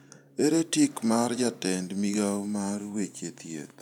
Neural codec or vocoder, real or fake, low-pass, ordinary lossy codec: vocoder, 44.1 kHz, 128 mel bands every 512 samples, BigVGAN v2; fake; 19.8 kHz; none